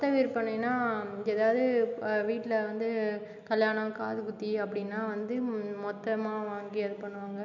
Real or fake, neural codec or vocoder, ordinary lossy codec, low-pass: real; none; none; 7.2 kHz